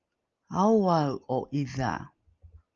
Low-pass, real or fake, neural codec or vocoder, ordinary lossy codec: 7.2 kHz; real; none; Opus, 24 kbps